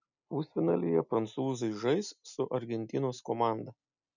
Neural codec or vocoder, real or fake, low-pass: none; real; 7.2 kHz